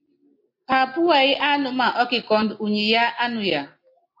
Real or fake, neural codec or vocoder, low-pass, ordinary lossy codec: real; none; 5.4 kHz; MP3, 32 kbps